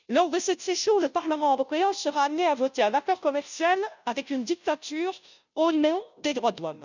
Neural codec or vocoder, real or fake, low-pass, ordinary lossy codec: codec, 16 kHz, 0.5 kbps, FunCodec, trained on Chinese and English, 25 frames a second; fake; 7.2 kHz; none